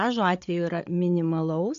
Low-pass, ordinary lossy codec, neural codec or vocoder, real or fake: 7.2 kHz; AAC, 64 kbps; codec, 16 kHz, 8 kbps, FreqCodec, larger model; fake